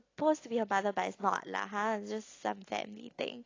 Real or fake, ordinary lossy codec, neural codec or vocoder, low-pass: real; AAC, 32 kbps; none; 7.2 kHz